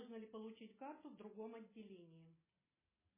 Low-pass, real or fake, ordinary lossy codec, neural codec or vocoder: 3.6 kHz; real; MP3, 16 kbps; none